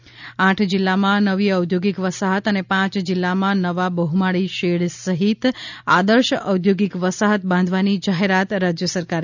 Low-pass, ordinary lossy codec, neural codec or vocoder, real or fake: 7.2 kHz; none; none; real